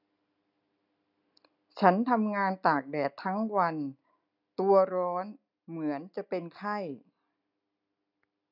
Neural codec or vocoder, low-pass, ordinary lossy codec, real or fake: none; 5.4 kHz; none; real